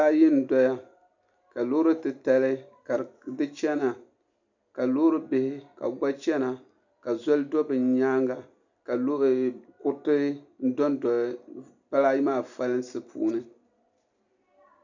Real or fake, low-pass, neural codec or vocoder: real; 7.2 kHz; none